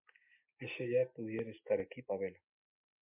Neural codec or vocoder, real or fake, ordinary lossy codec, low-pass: none; real; MP3, 32 kbps; 3.6 kHz